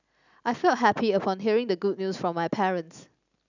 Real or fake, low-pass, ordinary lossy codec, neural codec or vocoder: real; 7.2 kHz; none; none